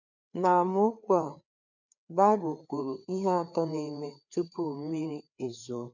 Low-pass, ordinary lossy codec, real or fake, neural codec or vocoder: 7.2 kHz; none; fake; codec, 16 kHz, 4 kbps, FreqCodec, larger model